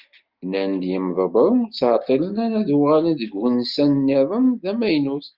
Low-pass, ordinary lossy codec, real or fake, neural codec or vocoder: 5.4 kHz; Opus, 24 kbps; real; none